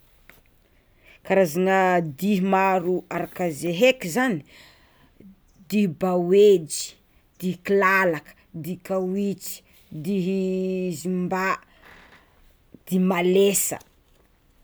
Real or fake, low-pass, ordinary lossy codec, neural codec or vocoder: real; none; none; none